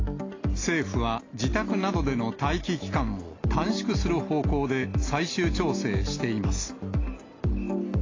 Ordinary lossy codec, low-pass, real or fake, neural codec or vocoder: AAC, 32 kbps; 7.2 kHz; real; none